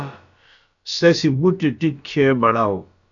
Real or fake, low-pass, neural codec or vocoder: fake; 7.2 kHz; codec, 16 kHz, about 1 kbps, DyCAST, with the encoder's durations